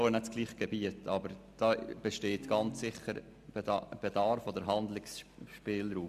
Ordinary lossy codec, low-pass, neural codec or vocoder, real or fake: MP3, 96 kbps; 14.4 kHz; none; real